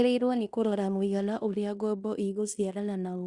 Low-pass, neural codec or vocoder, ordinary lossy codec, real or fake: 10.8 kHz; codec, 16 kHz in and 24 kHz out, 0.9 kbps, LongCat-Audio-Codec, fine tuned four codebook decoder; Opus, 64 kbps; fake